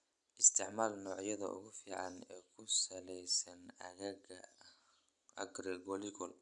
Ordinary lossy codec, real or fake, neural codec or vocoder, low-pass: none; real; none; 10.8 kHz